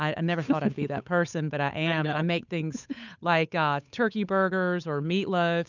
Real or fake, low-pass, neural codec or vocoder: fake; 7.2 kHz; codec, 16 kHz, 8 kbps, FunCodec, trained on Chinese and English, 25 frames a second